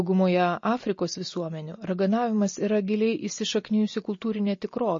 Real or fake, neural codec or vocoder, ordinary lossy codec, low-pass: real; none; MP3, 32 kbps; 7.2 kHz